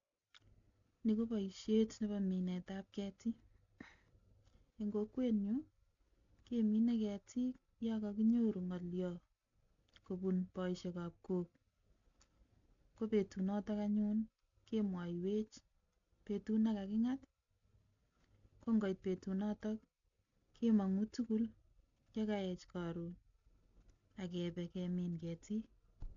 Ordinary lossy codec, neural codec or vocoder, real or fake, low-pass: none; none; real; 7.2 kHz